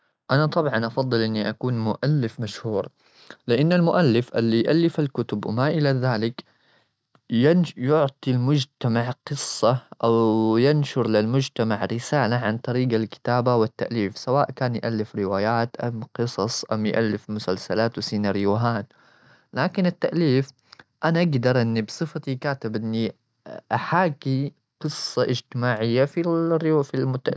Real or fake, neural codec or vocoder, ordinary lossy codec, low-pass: real; none; none; none